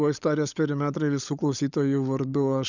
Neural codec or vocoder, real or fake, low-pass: codec, 16 kHz, 16 kbps, FunCodec, trained on LibriTTS, 50 frames a second; fake; 7.2 kHz